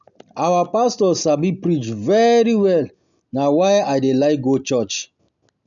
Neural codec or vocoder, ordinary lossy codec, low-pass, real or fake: none; none; 7.2 kHz; real